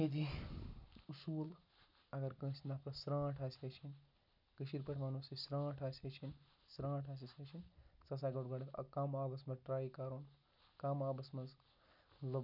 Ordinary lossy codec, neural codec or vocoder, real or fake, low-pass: none; none; real; 5.4 kHz